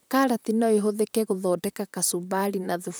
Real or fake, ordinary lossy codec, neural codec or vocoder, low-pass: fake; none; vocoder, 44.1 kHz, 128 mel bands, Pupu-Vocoder; none